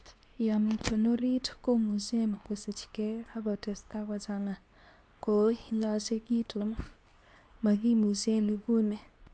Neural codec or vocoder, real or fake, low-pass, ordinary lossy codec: codec, 24 kHz, 0.9 kbps, WavTokenizer, medium speech release version 2; fake; 9.9 kHz; none